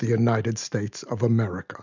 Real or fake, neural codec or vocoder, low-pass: real; none; 7.2 kHz